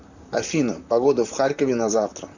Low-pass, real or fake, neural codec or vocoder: 7.2 kHz; fake; vocoder, 44.1 kHz, 128 mel bands, Pupu-Vocoder